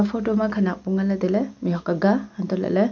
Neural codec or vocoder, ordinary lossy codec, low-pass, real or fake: none; none; 7.2 kHz; real